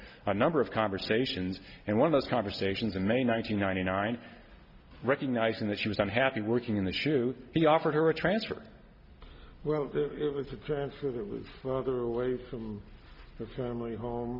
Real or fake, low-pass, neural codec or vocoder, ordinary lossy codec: real; 5.4 kHz; none; Opus, 64 kbps